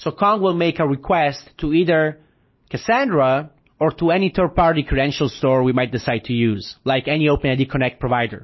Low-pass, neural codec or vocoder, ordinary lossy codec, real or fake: 7.2 kHz; none; MP3, 24 kbps; real